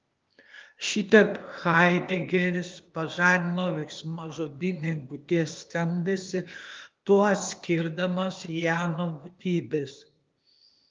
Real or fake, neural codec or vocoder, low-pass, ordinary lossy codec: fake; codec, 16 kHz, 0.8 kbps, ZipCodec; 7.2 kHz; Opus, 32 kbps